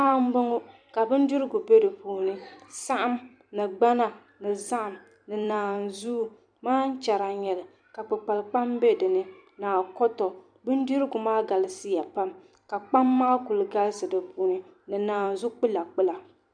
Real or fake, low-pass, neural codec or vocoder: fake; 9.9 kHz; vocoder, 44.1 kHz, 128 mel bands every 256 samples, BigVGAN v2